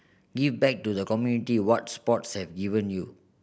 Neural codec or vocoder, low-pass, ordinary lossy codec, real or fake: none; none; none; real